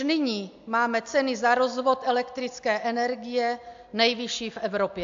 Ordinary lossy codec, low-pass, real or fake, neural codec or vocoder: AAC, 64 kbps; 7.2 kHz; real; none